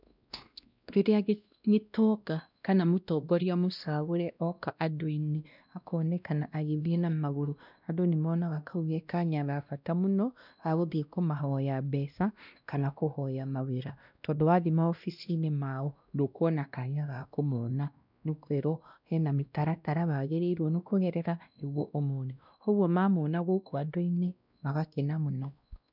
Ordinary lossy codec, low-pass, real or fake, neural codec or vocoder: none; 5.4 kHz; fake; codec, 16 kHz, 1 kbps, X-Codec, WavLM features, trained on Multilingual LibriSpeech